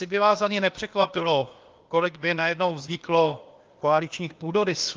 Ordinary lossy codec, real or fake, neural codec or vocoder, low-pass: Opus, 32 kbps; fake; codec, 16 kHz, 0.8 kbps, ZipCodec; 7.2 kHz